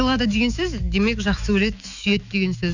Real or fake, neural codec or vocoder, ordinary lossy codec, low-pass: fake; autoencoder, 48 kHz, 128 numbers a frame, DAC-VAE, trained on Japanese speech; none; 7.2 kHz